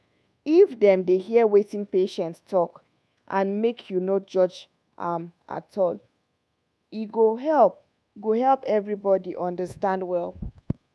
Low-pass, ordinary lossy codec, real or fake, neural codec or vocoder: none; none; fake; codec, 24 kHz, 1.2 kbps, DualCodec